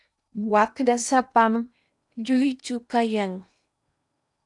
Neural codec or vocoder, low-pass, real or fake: codec, 16 kHz in and 24 kHz out, 0.8 kbps, FocalCodec, streaming, 65536 codes; 10.8 kHz; fake